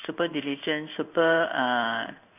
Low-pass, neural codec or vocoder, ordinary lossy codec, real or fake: 3.6 kHz; codec, 16 kHz in and 24 kHz out, 1 kbps, XY-Tokenizer; none; fake